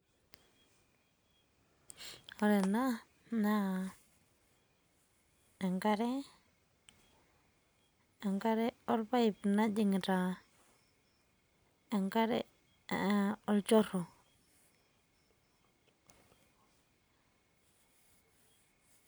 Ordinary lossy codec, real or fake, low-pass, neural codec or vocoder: none; real; none; none